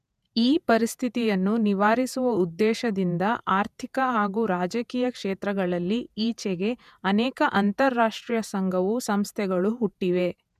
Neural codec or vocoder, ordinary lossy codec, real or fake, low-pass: vocoder, 48 kHz, 128 mel bands, Vocos; none; fake; 14.4 kHz